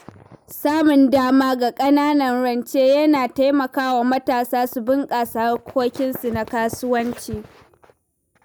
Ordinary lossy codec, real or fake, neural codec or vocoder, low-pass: none; real; none; none